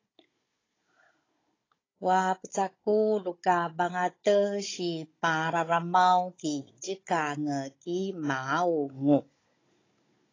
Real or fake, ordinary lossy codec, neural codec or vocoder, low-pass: fake; AAC, 32 kbps; codec, 16 kHz, 16 kbps, FunCodec, trained on Chinese and English, 50 frames a second; 7.2 kHz